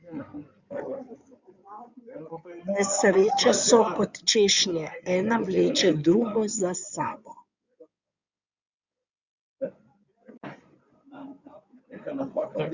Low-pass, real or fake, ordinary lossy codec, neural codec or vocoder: 7.2 kHz; fake; Opus, 64 kbps; codec, 16 kHz in and 24 kHz out, 2.2 kbps, FireRedTTS-2 codec